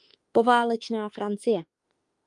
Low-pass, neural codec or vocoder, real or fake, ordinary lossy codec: 10.8 kHz; autoencoder, 48 kHz, 32 numbers a frame, DAC-VAE, trained on Japanese speech; fake; Opus, 32 kbps